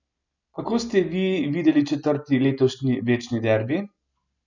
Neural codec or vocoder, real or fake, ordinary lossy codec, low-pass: none; real; none; 7.2 kHz